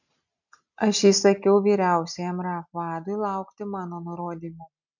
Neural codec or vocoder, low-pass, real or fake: none; 7.2 kHz; real